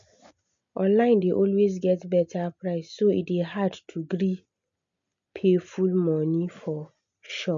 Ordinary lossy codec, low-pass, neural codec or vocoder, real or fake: MP3, 64 kbps; 7.2 kHz; none; real